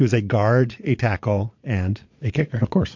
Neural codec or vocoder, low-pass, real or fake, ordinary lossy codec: vocoder, 22.05 kHz, 80 mel bands, Vocos; 7.2 kHz; fake; MP3, 48 kbps